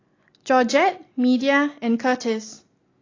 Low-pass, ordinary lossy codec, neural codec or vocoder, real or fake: 7.2 kHz; AAC, 32 kbps; none; real